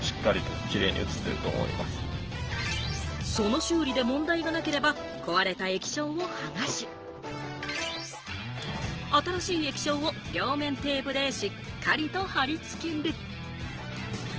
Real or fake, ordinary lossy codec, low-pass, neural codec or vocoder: real; Opus, 16 kbps; 7.2 kHz; none